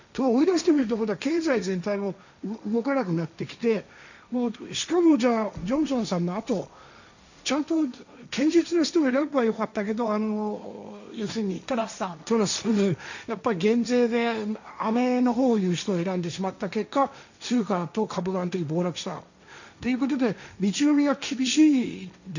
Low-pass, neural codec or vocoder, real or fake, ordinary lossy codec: 7.2 kHz; codec, 16 kHz, 1.1 kbps, Voila-Tokenizer; fake; none